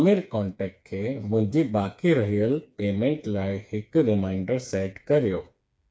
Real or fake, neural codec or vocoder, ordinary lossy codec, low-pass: fake; codec, 16 kHz, 4 kbps, FreqCodec, smaller model; none; none